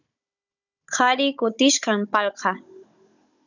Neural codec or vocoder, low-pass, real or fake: codec, 16 kHz, 16 kbps, FunCodec, trained on Chinese and English, 50 frames a second; 7.2 kHz; fake